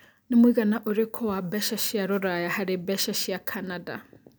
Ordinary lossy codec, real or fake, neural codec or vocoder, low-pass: none; real; none; none